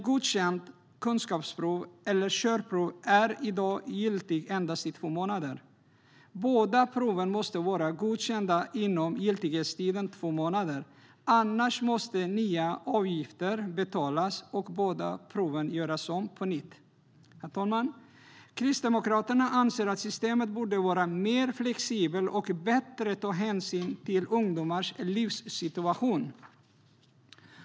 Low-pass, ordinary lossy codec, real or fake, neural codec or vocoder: none; none; real; none